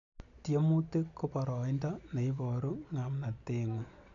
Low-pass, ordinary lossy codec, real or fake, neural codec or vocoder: 7.2 kHz; none; real; none